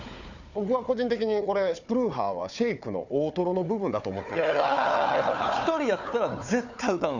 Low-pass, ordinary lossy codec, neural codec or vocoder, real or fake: 7.2 kHz; none; codec, 16 kHz, 4 kbps, FunCodec, trained on Chinese and English, 50 frames a second; fake